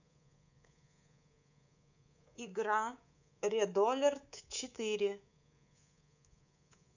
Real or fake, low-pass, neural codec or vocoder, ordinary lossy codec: fake; 7.2 kHz; codec, 24 kHz, 3.1 kbps, DualCodec; none